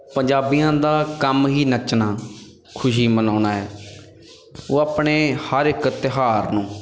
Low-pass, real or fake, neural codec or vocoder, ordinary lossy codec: none; real; none; none